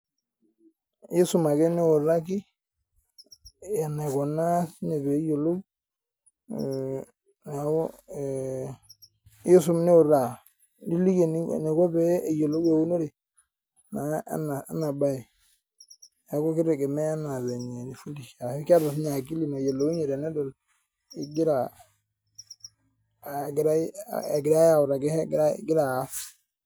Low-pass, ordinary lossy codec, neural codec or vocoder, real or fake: none; none; none; real